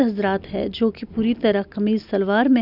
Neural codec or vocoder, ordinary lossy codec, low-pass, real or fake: none; none; 5.4 kHz; real